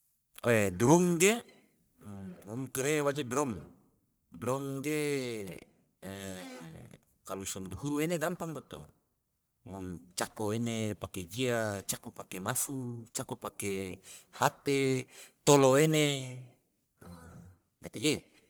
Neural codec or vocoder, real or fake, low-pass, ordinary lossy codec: codec, 44.1 kHz, 1.7 kbps, Pupu-Codec; fake; none; none